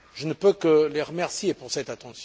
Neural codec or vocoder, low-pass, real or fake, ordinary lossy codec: none; none; real; none